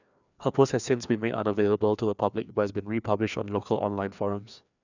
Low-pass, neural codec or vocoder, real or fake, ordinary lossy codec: 7.2 kHz; codec, 16 kHz, 2 kbps, FreqCodec, larger model; fake; none